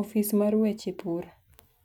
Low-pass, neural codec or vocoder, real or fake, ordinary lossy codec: 19.8 kHz; none; real; none